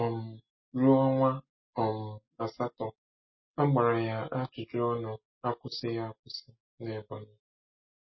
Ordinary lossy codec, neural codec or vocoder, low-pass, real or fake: MP3, 24 kbps; none; 7.2 kHz; real